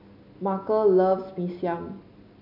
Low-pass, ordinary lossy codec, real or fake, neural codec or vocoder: 5.4 kHz; none; real; none